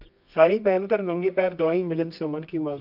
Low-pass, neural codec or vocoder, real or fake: 5.4 kHz; codec, 24 kHz, 0.9 kbps, WavTokenizer, medium music audio release; fake